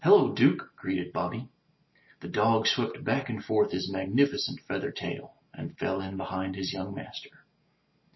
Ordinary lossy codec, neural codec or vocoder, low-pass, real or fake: MP3, 24 kbps; none; 7.2 kHz; real